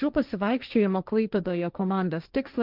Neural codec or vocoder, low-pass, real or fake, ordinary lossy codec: codec, 16 kHz, 1.1 kbps, Voila-Tokenizer; 5.4 kHz; fake; Opus, 24 kbps